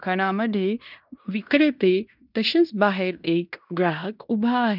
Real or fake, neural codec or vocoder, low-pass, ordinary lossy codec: fake; codec, 16 kHz in and 24 kHz out, 0.9 kbps, LongCat-Audio-Codec, fine tuned four codebook decoder; 5.4 kHz; none